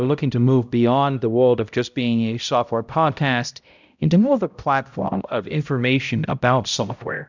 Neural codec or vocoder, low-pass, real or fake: codec, 16 kHz, 0.5 kbps, X-Codec, HuBERT features, trained on balanced general audio; 7.2 kHz; fake